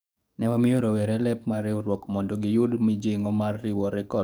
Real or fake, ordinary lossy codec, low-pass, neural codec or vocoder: fake; none; none; codec, 44.1 kHz, 7.8 kbps, DAC